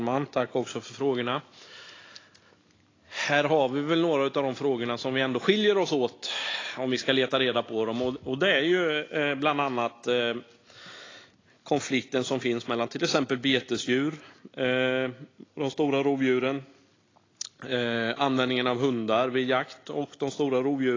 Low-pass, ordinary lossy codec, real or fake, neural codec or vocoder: 7.2 kHz; AAC, 32 kbps; real; none